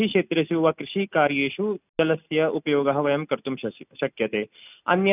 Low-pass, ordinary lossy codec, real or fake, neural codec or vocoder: 3.6 kHz; none; real; none